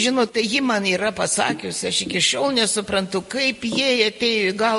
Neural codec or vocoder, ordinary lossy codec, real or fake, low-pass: none; MP3, 48 kbps; real; 14.4 kHz